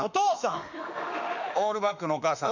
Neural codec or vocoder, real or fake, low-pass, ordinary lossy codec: autoencoder, 48 kHz, 32 numbers a frame, DAC-VAE, trained on Japanese speech; fake; 7.2 kHz; none